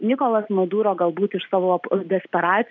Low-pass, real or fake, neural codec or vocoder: 7.2 kHz; real; none